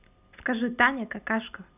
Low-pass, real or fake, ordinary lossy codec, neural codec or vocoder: 3.6 kHz; real; none; none